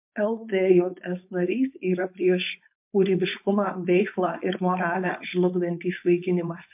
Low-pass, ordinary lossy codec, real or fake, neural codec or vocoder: 3.6 kHz; MP3, 32 kbps; fake; codec, 16 kHz, 4.8 kbps, FACodec